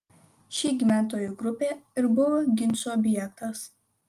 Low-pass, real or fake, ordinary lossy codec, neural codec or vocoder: 14.4 kHz; real; Opus, 32 kbps; none